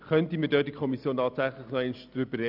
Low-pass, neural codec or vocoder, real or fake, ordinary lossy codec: 5.4 kHz; none; real; none